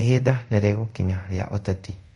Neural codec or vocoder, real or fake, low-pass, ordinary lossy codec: codec, 24 kHz, 0.5 kbps, DualCodec; fake; 10.8 kHz; MP3, 48 kbps